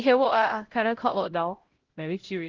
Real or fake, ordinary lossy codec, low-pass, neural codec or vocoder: fake; Opus, 16 kbps; 7.2 kHz; codec, 16 kHz, 0.5 kbps, X-Codec, HuBERT features, trained on LibriSpeech